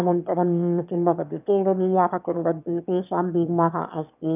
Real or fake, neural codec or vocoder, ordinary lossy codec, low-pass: fake; autoencoder, 22.05 kHz, a latent of 192 numbers a frame, VITS, trained on one speaker; none; 3.6 kHz